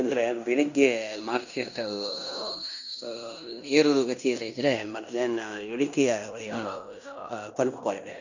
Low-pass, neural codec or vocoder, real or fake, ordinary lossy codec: 7.2 kHz; codec, 16 kHz in and 24 kHz out, 0.9 kbps, LongCat-Audio-Codec, fine tuned four codebook decoder; fake; none